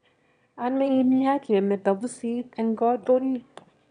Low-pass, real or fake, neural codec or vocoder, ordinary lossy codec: 9.9 kHz; fake; autoencoder, 22.05 kHz, a latent of 192 numbers a frame, VITS, trained on one speaker; none